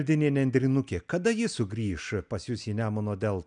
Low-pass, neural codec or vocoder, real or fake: 9.9 kHz; none; real